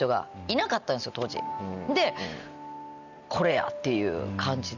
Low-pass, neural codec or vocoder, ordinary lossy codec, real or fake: 7.2 kHz; none; none; real